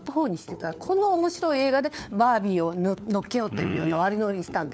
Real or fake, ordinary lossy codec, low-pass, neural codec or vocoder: fake; none; none; codec, 16 kHz, 4 kbps, FunCodec, trained on LibriTTS, 50 frames a second